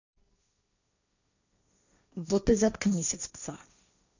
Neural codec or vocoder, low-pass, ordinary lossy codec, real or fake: codec, 16 kHz, 1.1 kbps, Voila-Tokenizer; 7.2 kHz; none; fake